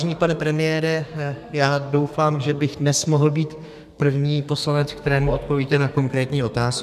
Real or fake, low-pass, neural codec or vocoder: fake; 14.4 kHz; codec, 32 kHz, 1.9 kbps, SNAC